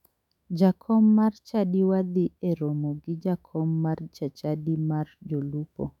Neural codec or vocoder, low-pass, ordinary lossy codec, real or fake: autoencoder, 48 kHz, 128 numbers a frame, DAC-VAE, trained on Japanese speech; 19.8 kHz; none; fake